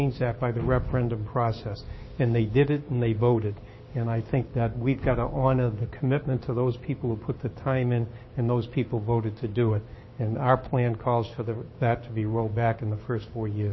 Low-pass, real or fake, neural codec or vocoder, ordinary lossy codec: 7.2 kHz; fake; codec, 16 kHz, 6 kbps, DAC; MP3, 24 kbps